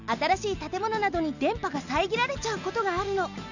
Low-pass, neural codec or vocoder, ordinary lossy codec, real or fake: 7.2 kHz; none; none; real